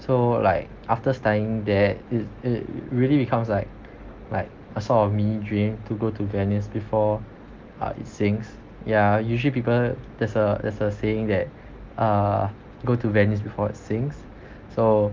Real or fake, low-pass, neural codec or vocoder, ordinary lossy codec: real; 7.2 kHz; none; Opus, 32 kbps